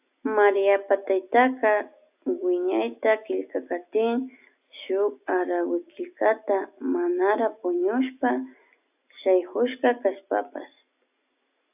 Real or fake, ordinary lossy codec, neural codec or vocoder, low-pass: real; AAC, 32 kbps; none; 3.6 kHz